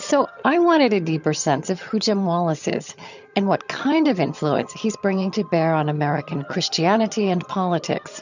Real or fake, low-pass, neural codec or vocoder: fake; 7.2 kHz; vocoder, 22.05 kHz, 80 mel bands, HiFi-GAN